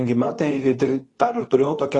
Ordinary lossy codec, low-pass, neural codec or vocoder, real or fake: AAC, 32 kbps; 10.8 kHz; codec, 24 kHz, 0.9 kbps, WavTokenizer, medium speech release version 2; fake